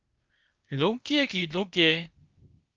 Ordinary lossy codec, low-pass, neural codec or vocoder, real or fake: Opus, 24 kbps; 7.2 kHz; codec, 16 kHz, 0.8 kbps, ZipCodec; fake